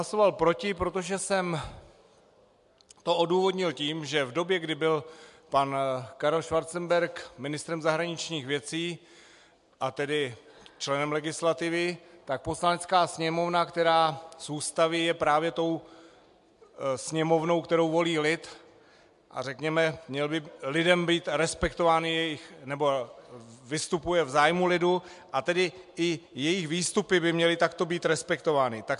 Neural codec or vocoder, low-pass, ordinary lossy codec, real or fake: none; 10.8 kHz; MP3, 64 kbps; real